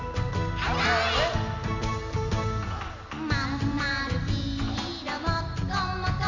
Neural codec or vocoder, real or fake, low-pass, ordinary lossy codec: none; real; 7.2 kHz; none